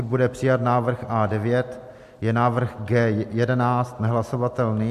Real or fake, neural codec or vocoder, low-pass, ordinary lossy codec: real; none; 14.4 kHz; MP3, 64 kbps